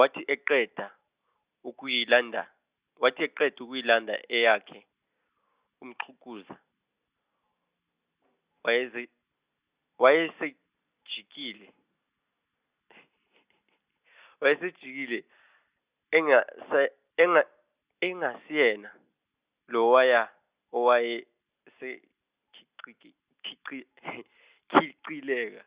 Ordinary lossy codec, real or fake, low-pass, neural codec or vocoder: Opus, 64 kbps; real; 3.6 kHz; none